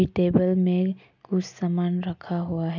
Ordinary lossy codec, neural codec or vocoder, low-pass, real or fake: none; none; 7.2 kHz; real